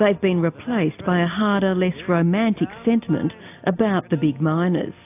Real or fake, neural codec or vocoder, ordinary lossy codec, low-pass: real; none; AAC, 32 kbps; 3.6 kHz